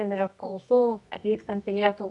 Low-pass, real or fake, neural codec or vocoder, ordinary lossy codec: 10.8 kHz; fake; codec, 24 kHz, 0.9 kbps, WavTokenizer, medium music audio release; MP3, 96 kbps